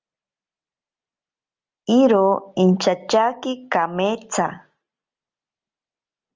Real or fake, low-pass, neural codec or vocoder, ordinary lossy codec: real; 7.2 kHz; none; Opus, 32 kbps